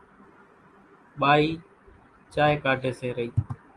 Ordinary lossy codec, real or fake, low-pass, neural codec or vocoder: Opus, 24 kbps; real; 10.8 kHz; none